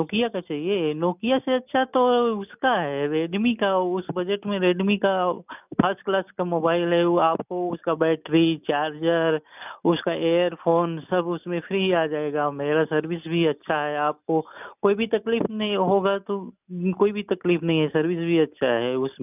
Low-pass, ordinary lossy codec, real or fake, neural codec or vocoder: 3.6 kHz; none; real; none